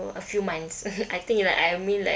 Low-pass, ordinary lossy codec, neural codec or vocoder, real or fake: none; none; none; real